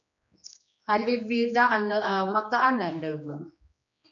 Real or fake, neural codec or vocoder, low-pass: fake; codec, 16 kHz, 2 kbps, X-Codec, HuBERT features, trained on general audio; 7.2 kHz